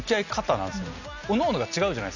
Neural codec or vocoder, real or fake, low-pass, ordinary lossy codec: none; real; 7.2 kHz; none